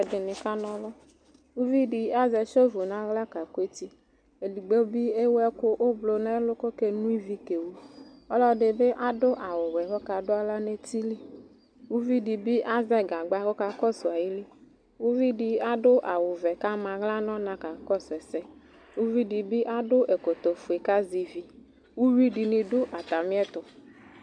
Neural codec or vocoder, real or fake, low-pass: none; real; 9.9 kHz